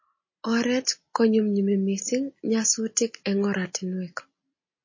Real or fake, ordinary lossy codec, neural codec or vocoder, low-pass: real; MP3, 32 kbps; none; 7.2 kHz